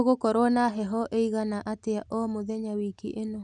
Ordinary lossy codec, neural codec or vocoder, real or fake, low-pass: none; none; real; 9.9 kHz